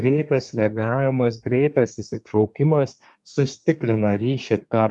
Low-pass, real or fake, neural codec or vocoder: 10.8 kHz; fake; codec, 24 kHz, 1 kbps, SNAC